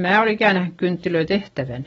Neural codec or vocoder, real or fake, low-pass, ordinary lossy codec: none; real; 14.4 kHz; AAC, 24 kbps